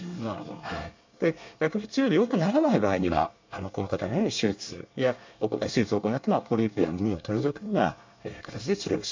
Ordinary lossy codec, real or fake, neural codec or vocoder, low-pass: MP3, 64 kbps; fake; codec, 24 kHz, 1 kbps, SNAC; 7.2 kHz